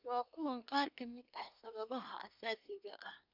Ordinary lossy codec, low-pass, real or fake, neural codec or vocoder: none; 5.4 kHz; fake; codec, 24 kHz, 1 kbps, SNAC